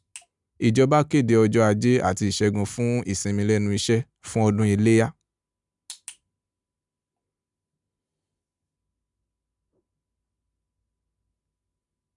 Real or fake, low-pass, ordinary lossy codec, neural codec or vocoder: real; 10.8 kHz; none; none